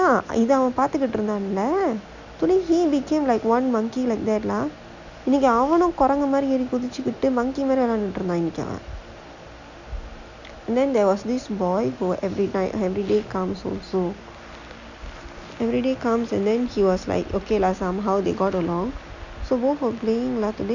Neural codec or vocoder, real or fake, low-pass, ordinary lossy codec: none; real; 7.2 kHz; none